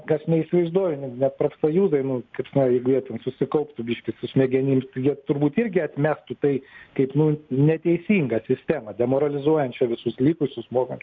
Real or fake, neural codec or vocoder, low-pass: real; none; 7.2 kHz